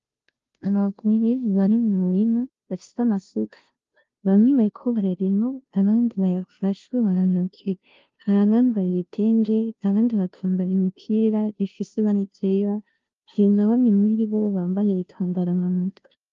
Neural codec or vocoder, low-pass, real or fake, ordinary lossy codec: codec, 16 kHz, 0.5 kbps, FunCodec, trained on Chinese and English, 25 frames a second; 7.2 kHz; fake; Opus, 32 kbps